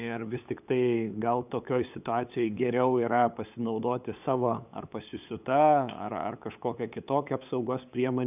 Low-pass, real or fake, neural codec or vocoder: 3.6 kHz; fake; codec, 16 kHz, 8 kbps, FunCodec, trained on LibriTTS, 25 frames a second